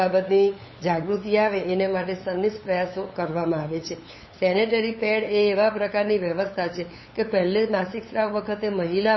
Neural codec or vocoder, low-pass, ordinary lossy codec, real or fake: codec, 16 kHz, 8 kbps, FunCodec, trained on LibriTTS, 25 frames a second; 7.2 kHz; MP3, 24 kbps; fake